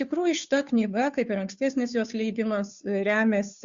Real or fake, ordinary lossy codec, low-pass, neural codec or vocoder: fake; Opus, 64 kbps; 7.2 kHz; codec, 16 kHz, 2 kbps, FunCodec, trained on Chinese and English, 25 frames a second